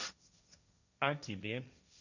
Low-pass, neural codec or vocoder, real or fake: 7.2 kHz; codec, 16 kHz, 1.1 kbps, Voila-Tokenizer; fake